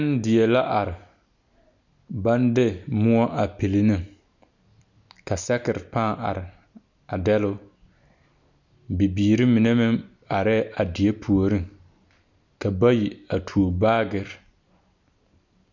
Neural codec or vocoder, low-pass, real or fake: none; 7.2 kHz; real